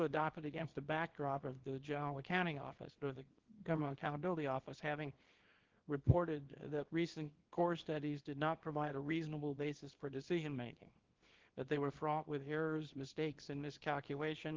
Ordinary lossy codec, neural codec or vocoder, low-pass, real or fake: Opus, 16 kbps; codec, 24 kHz, 0.9 kbps, WavTokenizer, small release; 7.2 kHz; fake